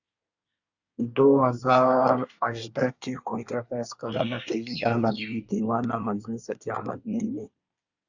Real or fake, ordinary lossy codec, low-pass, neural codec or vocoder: fake; Opus, 64 kbps; 7.2 kHz; codec, 24 kHz, 1 kbps, SNAC